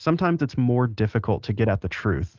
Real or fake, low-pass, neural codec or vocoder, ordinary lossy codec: real; 7.2 kHz; none; Opus, 32 kbps